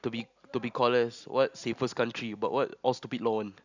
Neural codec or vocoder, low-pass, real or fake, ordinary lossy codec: none; 7.2 kHz; real; Opus, 64 kbps